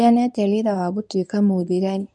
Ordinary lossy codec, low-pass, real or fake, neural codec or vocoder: none; none; fake; codec, 24 kHz, 0.9 kbps, WavTokenizer, medium speech release version 1